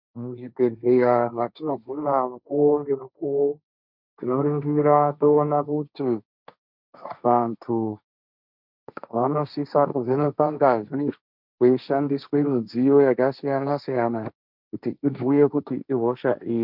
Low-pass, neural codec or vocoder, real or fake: 5.4 kHz; codec, 16 kHz, 1.1 kbps, Voila-Tokenizer; fake